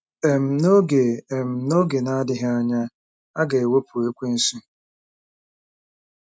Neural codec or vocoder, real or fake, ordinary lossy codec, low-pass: none; real; none; none